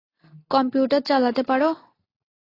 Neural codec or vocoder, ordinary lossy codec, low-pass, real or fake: none; AAC, 24 kbps; 5.4 kHz; real